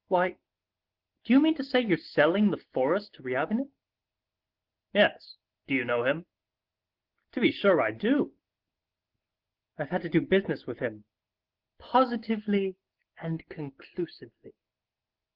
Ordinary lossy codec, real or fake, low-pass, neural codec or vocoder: Opus, 16 kbps; real; 5.4 kHz; none